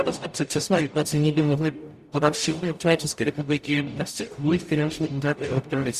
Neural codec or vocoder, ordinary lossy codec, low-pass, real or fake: codec, 44.1 kHz, 0.9 kbps, DAC; Opus, 64 kbps; 14.4 kHz; fake